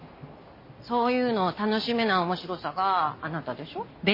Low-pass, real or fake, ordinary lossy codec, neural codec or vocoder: 5.4 kHz; real; MP3, 24 kbps; none